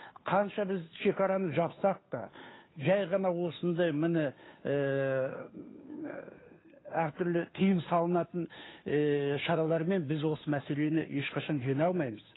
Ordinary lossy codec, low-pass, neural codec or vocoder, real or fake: AAC, 16 kbps; 7.2 kHz; codec, 16 kHz, 2 kbps, FunCodec, trained on Chinese and English, 25 frames a second; fake